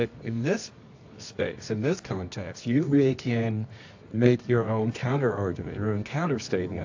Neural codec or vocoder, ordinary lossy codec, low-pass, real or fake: codec, 24 kHz, 0.9 kbps, WavTokenizer, medium music audio release; AAC, 48 kbps; 7.2 kHz; fake